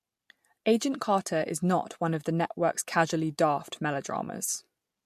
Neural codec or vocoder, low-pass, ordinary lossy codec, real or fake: none; 14.4 kHz; MP3, 64 kbps; real